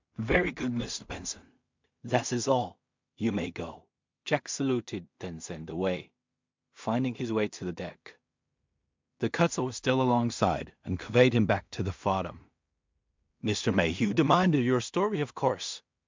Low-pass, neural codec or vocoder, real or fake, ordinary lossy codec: 7.2 kHz; codec, 16 kHz in and 24 kHz out, 0.4 kbps, LongCat-Audio-Codec, two codebook decoder; fake; MP3, 64 kbps